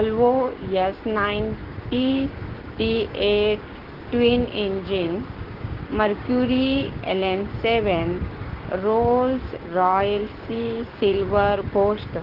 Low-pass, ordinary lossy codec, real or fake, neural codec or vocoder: 5.4 kHz; Opus, 16 kbps; fake; codec, 16 kHz, 6 kbps, DAC